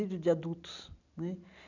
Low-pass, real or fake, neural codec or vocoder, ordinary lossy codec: 7.2 kHz; real; none; none